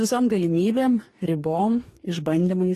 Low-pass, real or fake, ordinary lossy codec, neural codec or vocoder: 14.4 kHz; fake; AAC, 48 kbps; codec, 44.1 kHz, 2.6 kbps, DAC